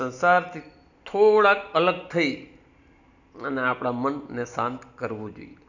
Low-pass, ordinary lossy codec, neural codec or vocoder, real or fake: 7.2 kHz; none; none; real